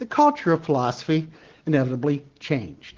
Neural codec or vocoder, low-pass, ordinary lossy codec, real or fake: none; 7.2 kHz; Opus, 16 kbps; real